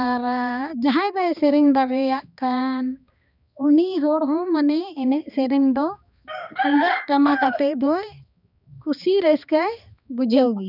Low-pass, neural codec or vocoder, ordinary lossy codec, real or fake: 5.4 kHz; codec, 16 kHz, 2 kbps, X-Codec, HuBERT features, trained on balanced general audio; none; fake